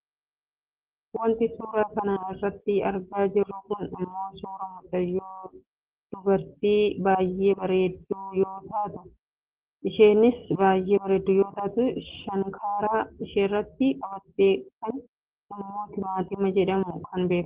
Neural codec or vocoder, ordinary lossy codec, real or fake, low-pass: none; Opus, 16 kbps; real; 3.6 kHz